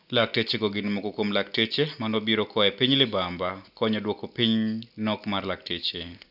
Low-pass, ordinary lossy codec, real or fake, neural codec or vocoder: 5.4 kHz; none; real; none